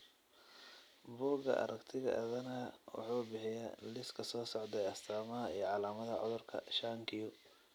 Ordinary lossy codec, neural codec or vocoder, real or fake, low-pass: none; vocoder, 44.1 kHz, 128 mel bands every 256 samples, BigVGAN v2; fake; none